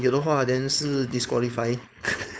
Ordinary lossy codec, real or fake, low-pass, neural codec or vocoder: none; fake; none; codec, 16 kHz, 4.8 kbps, FACodec